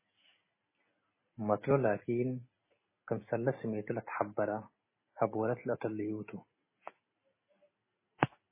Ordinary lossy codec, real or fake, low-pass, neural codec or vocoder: MP3, 16 kbps; real; 3.6 kHz; none